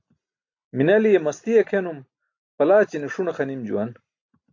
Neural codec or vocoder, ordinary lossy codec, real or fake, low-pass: none; AAC, 48 kbps; real; 7.2 kHz